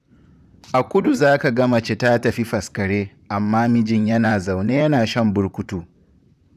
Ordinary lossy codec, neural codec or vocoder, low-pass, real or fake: none; vocoder, 44.1 kHz, 128 mel bands, Pupu-Vocoder; 14.4 kHz; fake